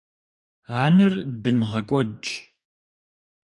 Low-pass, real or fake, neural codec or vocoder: 10.8 kHz; fake; codec, 44.1 kHz, 2.6 kbps, DAC